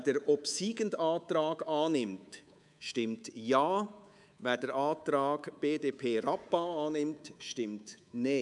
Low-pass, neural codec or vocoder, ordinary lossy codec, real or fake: 10.8 kHz; codec, 24 kHz, 3.1 kbps, DualCodec; none; fake